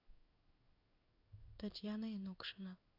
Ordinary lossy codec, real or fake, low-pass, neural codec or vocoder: none; fake; 5.4 kHz; codec, 16 kHz in and 24 kHz out, 1 kbps, XY-Tokenizer